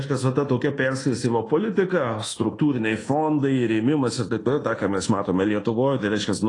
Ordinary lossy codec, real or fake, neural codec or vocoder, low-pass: AAC, 32 kbps; fake; codec, 24 kHz, 1.2 kbps, DualCodec; 10.8 kHz